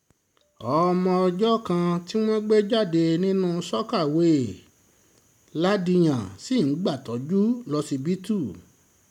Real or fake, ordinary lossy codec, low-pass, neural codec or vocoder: real; MP3, 96 kbps; 19.8 kHz; none